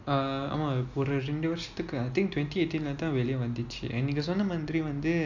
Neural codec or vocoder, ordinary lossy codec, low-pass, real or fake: none; none; 7.2 kHz; real